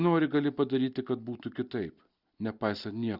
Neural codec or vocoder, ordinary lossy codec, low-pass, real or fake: none; Opus, 64 kbps; 5.4 kHz; real